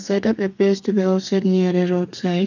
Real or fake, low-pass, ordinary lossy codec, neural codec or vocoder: fake; 7.2 kHz; none; codec, 32 kHz, 1.9 kbps, SNAC